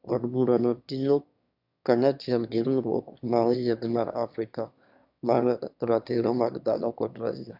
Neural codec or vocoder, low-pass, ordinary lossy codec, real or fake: autoencoder, 22.05 kHz, a latent of 192 numbers a frame, VITS, trained on one speaker; 5.4 kHz; AAC, 48 kbps; fake